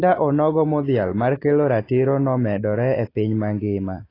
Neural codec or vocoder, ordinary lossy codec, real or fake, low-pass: none; AAC, 24 kbps; real; 5.4 kHz